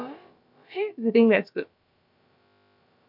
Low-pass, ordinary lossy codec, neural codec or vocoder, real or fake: 5.4 kHz; MP3, 48 kbps; codec, 16 kHz, about 1 kbps, DyCAST, with the encoder's durations; fake